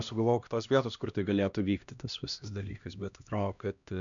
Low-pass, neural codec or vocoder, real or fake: 7.2 kHz; codec, 16 kHz, 1 kbps, X-Codec, HuBERT features, trained on LibriSpeech; fake